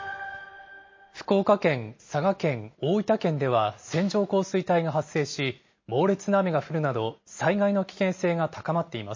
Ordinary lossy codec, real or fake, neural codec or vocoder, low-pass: MP3, 32 kbps; real; none; 7.2 kHz